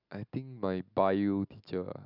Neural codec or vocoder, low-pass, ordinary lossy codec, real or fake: none; 5.4 kHz; none; real